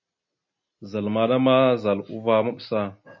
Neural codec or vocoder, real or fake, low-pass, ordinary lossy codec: none; real; 7.2 kHz; MP3, 32 kbps